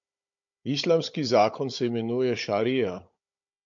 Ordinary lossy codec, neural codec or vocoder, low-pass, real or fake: MP3, 48 kbps; codec, 16 kHz, 16 kbps, FunCodec, trained on Chinese and English, 50 frames a second; 7.2 kHz; fake